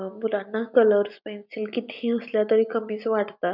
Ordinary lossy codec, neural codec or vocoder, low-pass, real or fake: none; none; 5.4 kHz; real